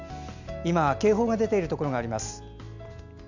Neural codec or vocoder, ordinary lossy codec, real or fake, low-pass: none; none; real; 7.2 kHz